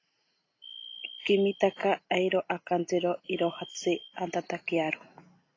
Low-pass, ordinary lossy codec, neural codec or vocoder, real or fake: 7.2 kHz; AAC, 32 kbps; none; real